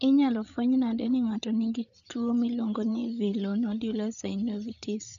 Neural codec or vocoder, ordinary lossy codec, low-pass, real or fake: codec, 16 kHz, 8 kbps, FreqCodec, larger model; none; 7.2 kHz; fake